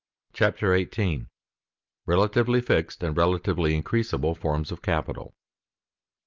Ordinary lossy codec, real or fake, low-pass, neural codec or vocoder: Opus, 32 kbps; real; 7.2 kHz; none